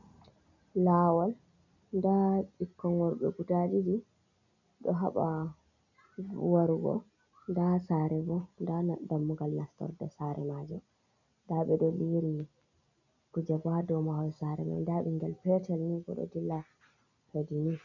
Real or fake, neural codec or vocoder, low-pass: real; none; 7.2 kHz